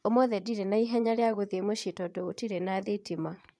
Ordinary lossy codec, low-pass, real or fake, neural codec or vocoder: none; none; fake; vocoder, 22.05 kHz, 80 mel bands, Vocos